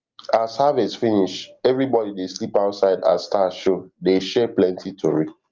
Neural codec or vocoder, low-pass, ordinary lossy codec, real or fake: none; 7.2 kHz; Opus, 32 kbps; real